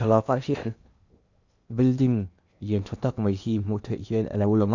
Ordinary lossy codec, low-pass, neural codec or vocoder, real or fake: Opus, 64 kbps; 7.2 kHz; codec, 16 kHz in and 24 kHz out, 0.8 kbps, FocalCodec, streaming, 65536 codes; fake